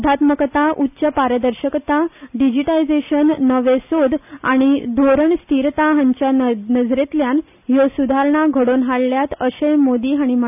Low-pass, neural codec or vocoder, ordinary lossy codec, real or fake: 3.6 kHz; none; none; real